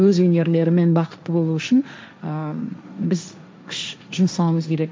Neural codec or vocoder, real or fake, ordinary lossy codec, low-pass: codec, 16 kHz, 1.1 kbps, Voila-Tokenizer; fake; none; none